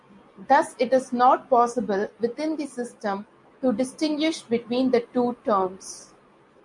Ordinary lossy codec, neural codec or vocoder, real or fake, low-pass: MP3, 64 kbps; none; real; 10.8 kHz